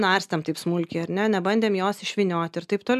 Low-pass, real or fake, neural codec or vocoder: 14.4 kHz; real; none